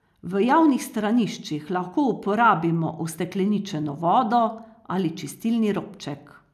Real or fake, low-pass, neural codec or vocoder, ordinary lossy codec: real; 14.4 kHz; none; none